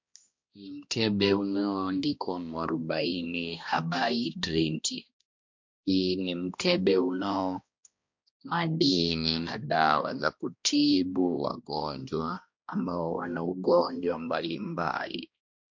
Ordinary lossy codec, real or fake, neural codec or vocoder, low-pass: MP3, 48 kbps; fake; codec, 16 kHz, 1 kbps, X-Codec, HuBERT features, trained on balanced general audio; 7.2 kHz